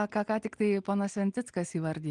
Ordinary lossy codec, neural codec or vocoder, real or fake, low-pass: Opus, 24 kbps; none; real; 9.9 kHz